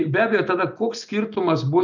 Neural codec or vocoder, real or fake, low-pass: none; real; 7.2 kHz